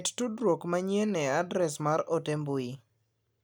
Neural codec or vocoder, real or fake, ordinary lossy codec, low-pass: none; real; none; none